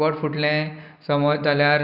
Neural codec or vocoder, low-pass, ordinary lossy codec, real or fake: none; 5.4 kHz; none; real